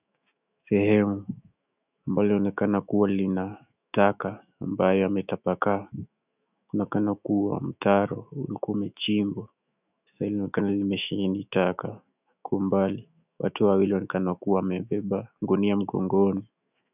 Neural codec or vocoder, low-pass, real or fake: autoencoder, 48 kHz, 128 numbers a frame, DAC-VAE, trained on Japanese speech; 3.6 kHz; fake